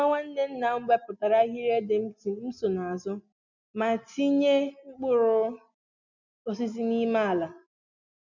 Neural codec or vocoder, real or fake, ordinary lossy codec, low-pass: none; real; none; 7.2 kHz